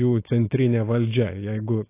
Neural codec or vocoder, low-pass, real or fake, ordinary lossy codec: none; 3.6 kHz; real; AAC, 24 kbps